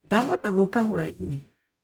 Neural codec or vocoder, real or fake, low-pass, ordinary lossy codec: codec, 44.1 kHz, 0.9 kbps, DAC; fake; none; none